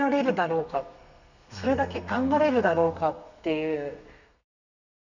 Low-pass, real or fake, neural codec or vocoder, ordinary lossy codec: 7.2 kHz; fake; codec, 32 kHz, 1.9 kbps, SNAC; none